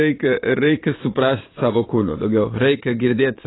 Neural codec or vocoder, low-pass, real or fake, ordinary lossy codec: vocoder, 22.05 kHz, 80 mel bands, Vocos; 7.2 kHz; fake; AAC, 16 kbps